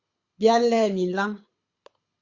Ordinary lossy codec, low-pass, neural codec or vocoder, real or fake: Opus, 64 kbps; 7.2 kHz; codec, 24 kHz, 6 kbps, HILCodec; fake